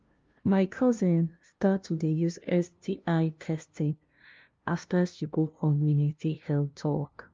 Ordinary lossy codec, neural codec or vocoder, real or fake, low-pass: Opus, 32 kbps; codec, 16 kHz, 0.5 kbps, FunCodec, trained on LibriTTS, 25 frames a second; fake; 7.2 kHz